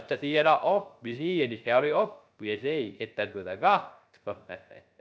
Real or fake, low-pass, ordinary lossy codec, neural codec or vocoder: fake; none; none; codec, 16 kHz, 0.3 kbps, FocalCodec